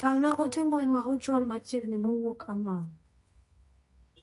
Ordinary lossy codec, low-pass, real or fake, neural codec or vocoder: MP3, 48 kbps; 10.8 kHz; fake; codec, 24 kHz, 0.9 kbps, WavTokenizer, medium music audio release